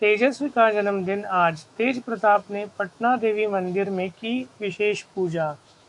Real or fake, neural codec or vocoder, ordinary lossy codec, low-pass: fake; autoencoder, 48 kHz, 128 numbers a frame, DAC-VAE, trained on Japanese speech; AAC, 64 kbps; 10.8 kHz